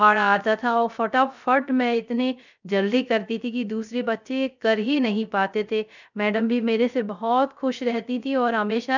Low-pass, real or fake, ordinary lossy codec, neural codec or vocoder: 7.2 kHz; fake; none; codec, 16 kHz, 0.3 kbps, FocalCodec